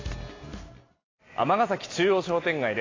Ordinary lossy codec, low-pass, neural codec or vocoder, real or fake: AAC, 32 kbps; 7.2 kHz; none; real